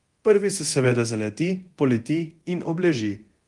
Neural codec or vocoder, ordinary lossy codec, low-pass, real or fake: codec, 24 kHz, 0.9 kbps, DualCodec; Opus, 24 kbps; 10.8 kHz; fake